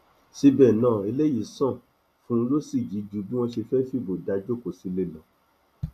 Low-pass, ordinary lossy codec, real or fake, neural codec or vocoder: 14.4 kHz; none; real; none